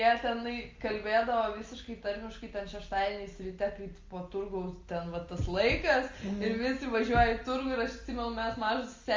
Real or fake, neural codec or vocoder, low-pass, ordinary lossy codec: real; none; 7.2 kHz; Opus, 32 kbps